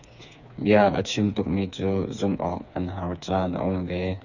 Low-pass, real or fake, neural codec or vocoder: 7.2 kHz; fake; codec, 16 kHz, 4 kbps, FreqCodec, smaller model